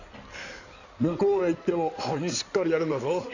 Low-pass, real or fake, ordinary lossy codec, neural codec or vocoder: 7.2 kHz; fake; none; codec, 16 kHz in and 24 kHz out, 2.2 kbps, FireRedTTS-2 codec